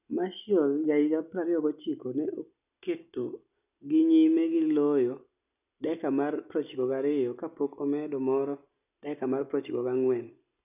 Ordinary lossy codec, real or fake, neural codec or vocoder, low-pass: none; real; none; 3.6 kHz